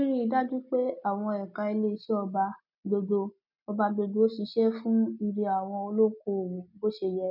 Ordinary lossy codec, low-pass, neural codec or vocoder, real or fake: none; 5.4 kHz; none; real